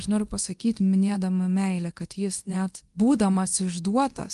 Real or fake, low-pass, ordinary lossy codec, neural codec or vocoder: fake; 10.8 kHz; Opus, 24 kbps; codec, 24 kHz, 0.9 kbps, DualCodec